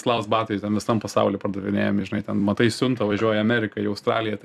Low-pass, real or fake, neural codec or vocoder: 14.4 kHz; fake; vocoder, 44.1 kHz, 128 mel bands every 256 samples, BigVGAN v2